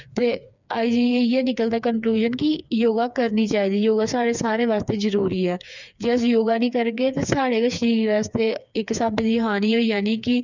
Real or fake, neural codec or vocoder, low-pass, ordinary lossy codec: fake; codec, 16 kHz, 4 kbps, FreqCodec, smaller model; 7.2 kHz; none